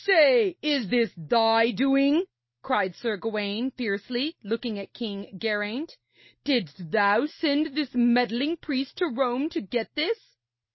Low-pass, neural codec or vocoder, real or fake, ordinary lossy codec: 7.2 kHz; none; real; MP3, 24 kbps